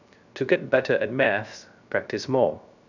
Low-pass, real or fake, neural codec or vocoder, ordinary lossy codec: 7.2 kHz; fake; codec, 16 kHz, 0.3 kbps, FocalCodec; none